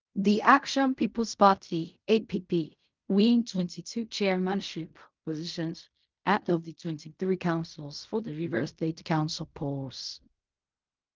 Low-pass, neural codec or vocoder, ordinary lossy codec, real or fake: 7.2 kHz; codec, 16 kHz in and 24 kHz out, 0.4 kbps, LongCat-Audio-Codec, fine tuned four codebook decoder; Opus, 24 kbps; fake